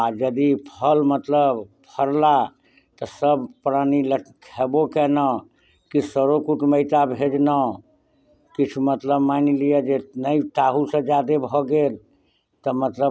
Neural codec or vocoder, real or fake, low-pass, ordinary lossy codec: none; real; none; none